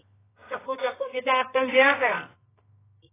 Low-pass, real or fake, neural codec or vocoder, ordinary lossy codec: 3.6 kHz; fake; codec, 24 kHz, 0.9 kbps, WavTokenizer, medium music audio release; AAC, 16 kbps